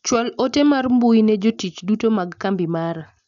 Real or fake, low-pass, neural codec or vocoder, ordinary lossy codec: real; 7.2 kHz; none; none